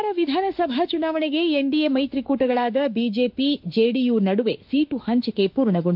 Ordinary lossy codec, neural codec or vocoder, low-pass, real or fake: MP3, 48 kbps; autoencoder, 48 kHz, 32 numbers a frame, DAC-VAE, trained on Japanese speech; 5.4 kHz; fake